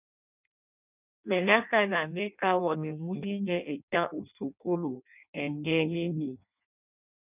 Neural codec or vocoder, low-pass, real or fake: codec, 16 kHz in and 24 kHz out, 0.6 kbps, FireRedTTS-2 codec; 3.6 kHz; fake